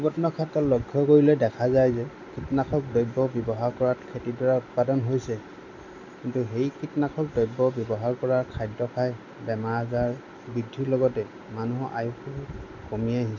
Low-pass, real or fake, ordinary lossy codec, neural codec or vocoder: 7.2 kHz; real; MP3, 64 kbps; none